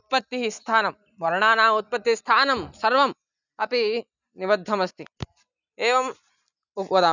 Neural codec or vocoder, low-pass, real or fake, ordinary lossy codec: none; 7.2 kHz; real; none